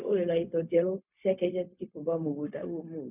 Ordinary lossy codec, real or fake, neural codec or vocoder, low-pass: none; fake; codec, 16 kHz, 0.4 kbps, LongCat-Audio-Codec; 3.6 kHz